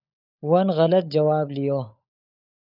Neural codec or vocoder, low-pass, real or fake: codec, 16 kHz, 16 kbps, FunCodec, trained on LibriTTS, 50 frames a second; 5.4 kHz; fake